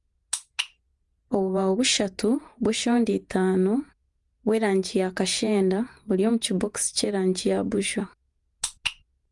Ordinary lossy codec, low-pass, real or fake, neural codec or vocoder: Opus, 32 kbps; 10.8 kHz; fake; vocoder, 48 kHz, 128 mel bands, Vocos